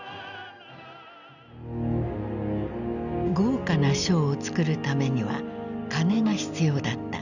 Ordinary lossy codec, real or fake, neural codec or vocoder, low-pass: none; real; none; 7.2 kHz